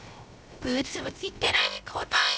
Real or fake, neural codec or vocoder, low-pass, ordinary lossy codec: fake; codec, 16 kHz, 0.3 kbps, FocalCodec; none; none